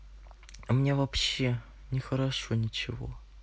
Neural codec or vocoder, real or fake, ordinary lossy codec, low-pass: none; real; none; none